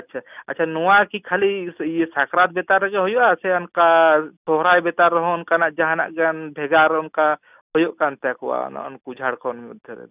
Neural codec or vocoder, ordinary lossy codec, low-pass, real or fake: none; none; 3.6 kHz; real